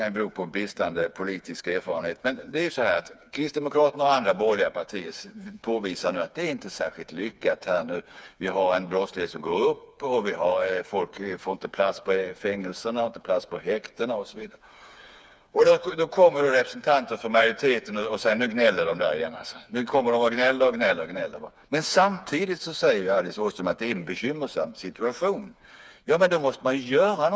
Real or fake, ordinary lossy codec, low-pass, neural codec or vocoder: fake; none; none; codec, 16 kHz, 4 kbps, FreqCodec, smaller model